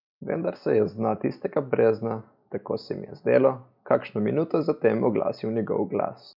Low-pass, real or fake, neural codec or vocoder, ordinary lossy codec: 5.4 kHz; real; none; none